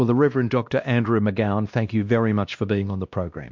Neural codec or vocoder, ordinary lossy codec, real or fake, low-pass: codec, 16 kHz, 1 kbps, X-Codec, WavLM features, trained on Multilingual LibriSpeech; MP3, 64 kbps; fake; 7.2 kHz